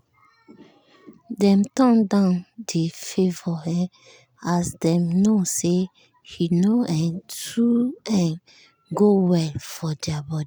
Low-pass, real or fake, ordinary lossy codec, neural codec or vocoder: none; real; none; none